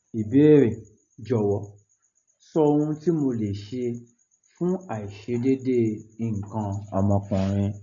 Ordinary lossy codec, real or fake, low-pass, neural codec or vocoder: none; real; 7.2 kHz; none